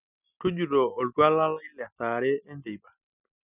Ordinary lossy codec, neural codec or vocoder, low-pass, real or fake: none; none; 3.6 kHz; real